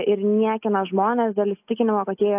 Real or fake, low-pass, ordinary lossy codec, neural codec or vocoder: real; 3.6 kHz; AAC, 32 kbps; none